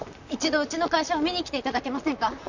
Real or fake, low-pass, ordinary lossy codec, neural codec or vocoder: fake; 7.2 kHz; none; vocoder, 44.1 kHz, 128 mel bands, Pupu-Vocoder